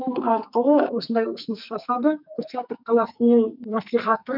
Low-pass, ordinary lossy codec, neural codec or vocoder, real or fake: 5.4 kHz; none; codec, 44.1 kHz, 2.6 kbps, SNAC; fake